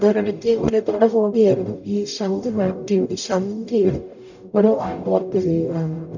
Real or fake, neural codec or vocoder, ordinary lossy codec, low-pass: fake; codec, 44.1 kHz, 0.9 kbps, DAC; none; 7.2 kHz